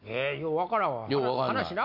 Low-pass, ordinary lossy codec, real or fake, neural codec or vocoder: 5.4 kHz; none; real; none